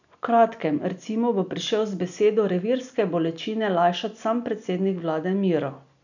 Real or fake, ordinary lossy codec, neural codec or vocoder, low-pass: real; none; none; 7.2 kHz